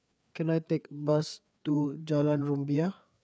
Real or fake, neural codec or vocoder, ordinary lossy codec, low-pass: fake; codec, 16 kHz, 4 kbps, FreqCodec, larger model; none; none